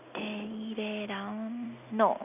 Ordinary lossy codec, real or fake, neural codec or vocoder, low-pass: none; real; none; 3.6 kHz